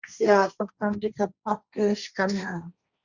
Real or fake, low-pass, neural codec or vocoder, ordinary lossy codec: fake; 7.2 kHz; codec, 16 kHz, 1.1 kbps, Voila-Tokenizer; Opus, 64 kbps